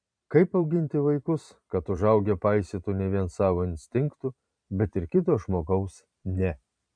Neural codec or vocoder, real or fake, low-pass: vocoder, 44.1 kHz, 128 mel bands every 512 samples, BigVGAN v2; fake; 9.9 kHz